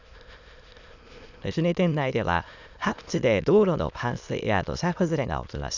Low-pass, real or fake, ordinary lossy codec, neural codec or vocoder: 7.2 kHz; fake; none; autoencoder, 22.05 kHz, a latent of 192 numbers a frame, VITS, trained on many speakers